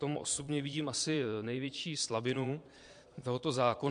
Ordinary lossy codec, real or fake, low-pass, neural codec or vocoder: MP3, 64 kbps; fake; 9.9 kHz; vocoder, 22.05 kHz, 80 mel bands, Vocos